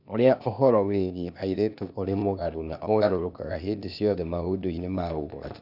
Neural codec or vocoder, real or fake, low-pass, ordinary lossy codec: codec, 16 kHz, 0.8 kbps, ZipCodec; fake; 5.4 kHz; none